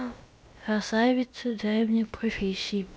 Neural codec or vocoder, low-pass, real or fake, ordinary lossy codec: codec, 16 kHz, about 1 kbps, DyCAST, with the encoder's durations; none; fake; none